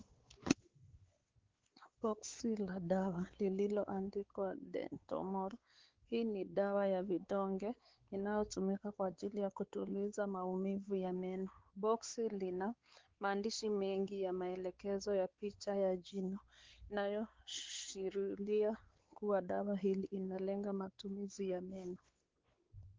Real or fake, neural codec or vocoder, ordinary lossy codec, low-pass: fake; codec, 16 kHz, 4 kbps, X-Codec, WavLM features, trained on Multilingual LibriSpeech; Opus, 16 kbps; 7.2 kHz